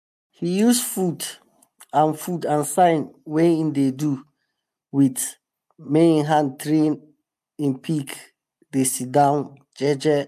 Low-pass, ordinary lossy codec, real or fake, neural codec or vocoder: 14.4 kHz; MP3, 96 kbps; real; none